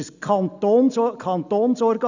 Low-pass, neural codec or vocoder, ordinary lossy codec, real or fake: 7.2 kHz; none; none; real